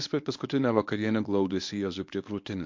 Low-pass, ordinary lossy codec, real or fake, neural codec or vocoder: 7.2 kHz; MP3, 48 kbps; fake; codec, 24 kHz, 0.9 kbps, WavTokenizer, medium speech release version 1